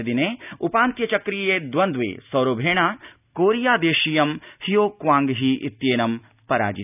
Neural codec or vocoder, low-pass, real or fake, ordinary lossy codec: none; 3.6 kHz; real; none